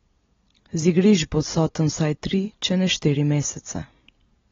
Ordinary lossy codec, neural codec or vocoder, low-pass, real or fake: AAC, 24 kbps; none; 7.2 kHz; real